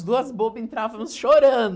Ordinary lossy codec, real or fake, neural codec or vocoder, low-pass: none; real; none; none